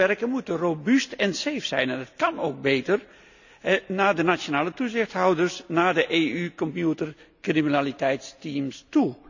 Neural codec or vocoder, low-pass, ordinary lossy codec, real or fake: none; 7.2 kHz; none; real